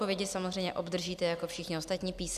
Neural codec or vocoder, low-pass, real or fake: none; 14.4 kHz; real